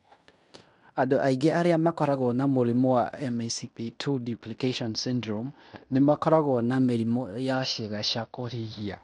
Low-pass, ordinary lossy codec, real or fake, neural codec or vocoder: 10.8 kHz; MP3, 96 kbps; fake; codec, 16 kHz in and 24 kHz out, 0.9 kbps, LongCat-Audio-Codec, fine tuned four codebook decoder